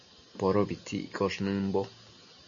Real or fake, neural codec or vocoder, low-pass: real; none; 7.2 kHz